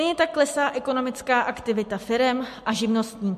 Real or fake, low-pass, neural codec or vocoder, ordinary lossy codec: real; 14.4 kHz; none; MP3, 64 kbps